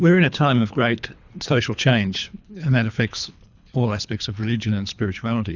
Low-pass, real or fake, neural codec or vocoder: 7.2 kHz; fake; codec, 24 kHz, 3 kbps, HILCodec